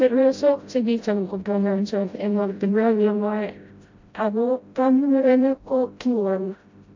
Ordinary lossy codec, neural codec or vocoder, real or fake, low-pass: MP3, 48 kbps; codec, 16 kHz, 0.5 kbps, FreqCodec, smaller model; fake; 7.2 kHz